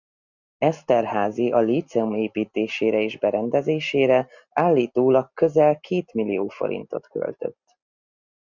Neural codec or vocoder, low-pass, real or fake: none; 7.2 kHz; real